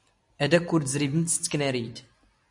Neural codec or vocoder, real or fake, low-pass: none; real; 10.8 kHz